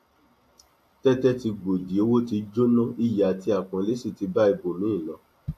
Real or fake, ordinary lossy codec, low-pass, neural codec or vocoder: real; AAC, 64 kbps; 14.4 kHz; none